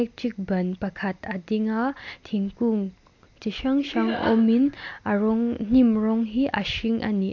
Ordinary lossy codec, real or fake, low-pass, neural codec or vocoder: MP3, 48 kbps; real; 7.2 kHz; none